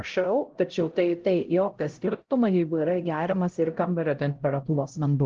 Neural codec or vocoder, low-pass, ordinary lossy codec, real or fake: codec, 16 kHz, 0.5 kbps, X-Codec, HuBERT features, trained on LibriSpeech; 7.2 kHz; Opus, 16 kbps; fake